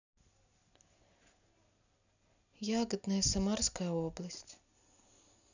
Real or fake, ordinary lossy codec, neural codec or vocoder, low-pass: real; none; none; 7.2 kHz